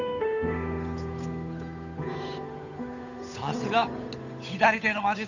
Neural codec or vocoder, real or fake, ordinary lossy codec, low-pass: codec, 16 kHz, 8 kbps, FunCodec, trained on Chinese and English, 25 frames a second; fake; none; 7.2 kHz